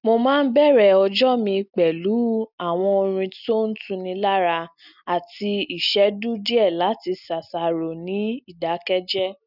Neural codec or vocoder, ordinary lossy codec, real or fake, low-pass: none; none; real; 5.4 kHz